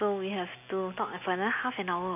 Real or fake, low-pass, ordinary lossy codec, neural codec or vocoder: real; 3.6 kHz; none; none